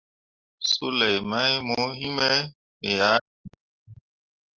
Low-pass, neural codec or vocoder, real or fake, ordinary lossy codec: 7.2 kHz; none; real; Opus, 24 kbps